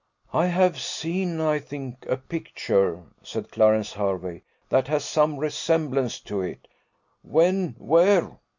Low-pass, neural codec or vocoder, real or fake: 7.2 kHz; none; real